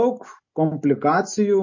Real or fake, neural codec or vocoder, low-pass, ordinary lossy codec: real; none; 7.2 kHz; MP3, 32 kbps